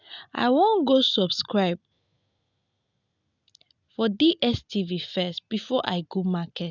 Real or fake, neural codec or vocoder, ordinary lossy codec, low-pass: real; none; none; 7.2 kHz